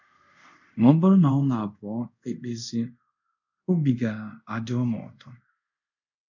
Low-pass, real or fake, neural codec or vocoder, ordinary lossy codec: 7.2 kHz; fake; codec, 24 kHz, 0.5 kbps, DualCodec; none